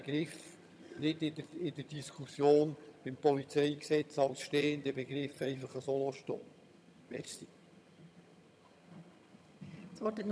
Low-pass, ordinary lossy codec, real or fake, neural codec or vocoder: none; none; fake; vocoder, 22.05 kHz, 80 mel bands, HiFi-GAN